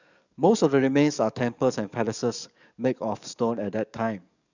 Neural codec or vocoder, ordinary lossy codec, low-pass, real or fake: codec, 44.1 kHz, 7.8 kbps, DAC; none; 7.2 kHz; fake